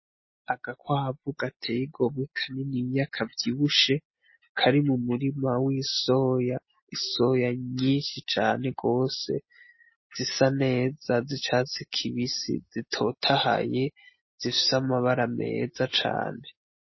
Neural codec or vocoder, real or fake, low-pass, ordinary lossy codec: none; real; 7.2 kHz; MP3, 24 kbps